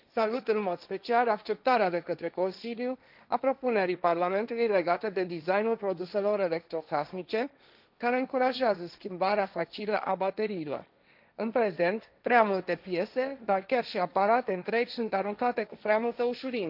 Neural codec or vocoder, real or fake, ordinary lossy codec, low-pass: codec, 16 kHz, 1.1 kbps, Voila-Tokenizer; fake; none; 5.4 kHz